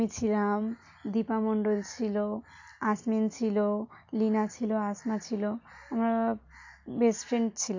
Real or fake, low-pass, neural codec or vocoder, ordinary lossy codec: real; 7.2 kHz; none; AAC, 48 kbps